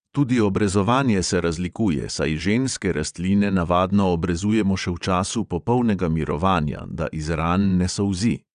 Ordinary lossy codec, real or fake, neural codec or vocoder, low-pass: none; fake; vocoder, 22.05 kHz, 80 mel bands, WaveNeXt; 9.9 kHz